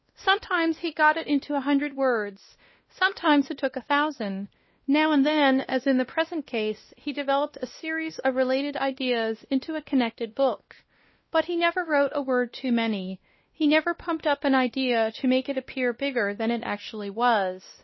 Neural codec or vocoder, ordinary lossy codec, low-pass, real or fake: codec, 16 kHz, 1 kbps, X-Codec, WavLM features, trained on Multilingual LibriSpeech; MP3, 24 kbps; 7.2 kHz; fake